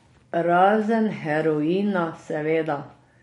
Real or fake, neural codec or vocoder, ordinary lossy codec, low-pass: real; none; MP3, 48 kbps; 19.8 kHz